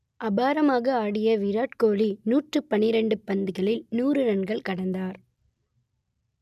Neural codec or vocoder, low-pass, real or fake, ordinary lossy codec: none; 14.4 kHz; real; none